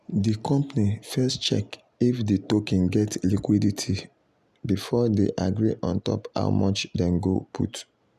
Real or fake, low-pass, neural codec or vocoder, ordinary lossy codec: real; 14.4 kHz; none; none